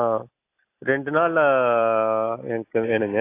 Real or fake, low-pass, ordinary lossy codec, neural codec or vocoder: real; 3.6 kHz; AAC, 24 kbps; none